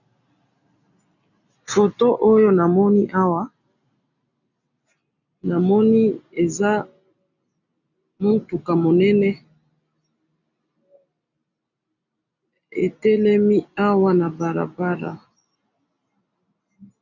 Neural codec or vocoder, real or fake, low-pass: none; real; 7.2 kHz